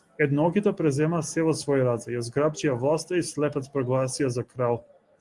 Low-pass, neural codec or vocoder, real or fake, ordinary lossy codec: 10.8 kHz; none; real; Opus, 24 kbps